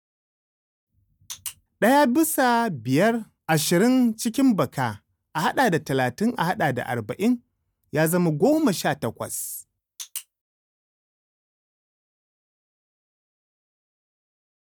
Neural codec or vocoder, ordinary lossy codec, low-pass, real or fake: none; none; none; real